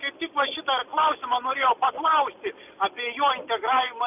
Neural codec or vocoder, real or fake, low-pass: none; real; 3.6 kHz